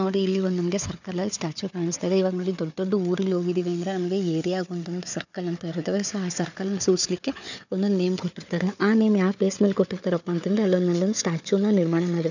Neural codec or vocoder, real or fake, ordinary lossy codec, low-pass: codec, 16 kHz, 4 kbps, FunCodec, trained on Chinese and English, 50 frames a second; fake; none; 7.2 kHz